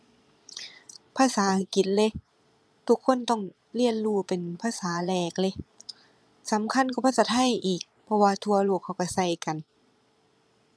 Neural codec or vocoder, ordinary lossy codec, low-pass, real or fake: vocoder, 22.05 kHz, 80 mel bands, Vocos; none; none; fake